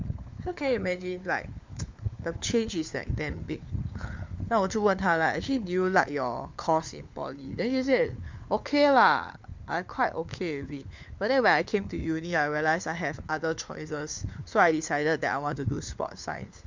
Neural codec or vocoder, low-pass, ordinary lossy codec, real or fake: codec, 16 kHz, 4 kbps, FunCodec, trained on LibriTTS, 50 frames a second; 7.2 kHz; MP3, 64 kbps; fake